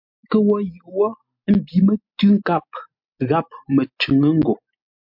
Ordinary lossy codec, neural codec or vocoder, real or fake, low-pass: MP3, 48 kbps; none; real; 5.4 kHz